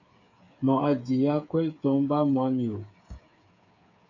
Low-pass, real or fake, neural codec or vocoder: 7.2 kHz; fake; codec, 16 kHz, 8 kbps, FreqCodec, smaller model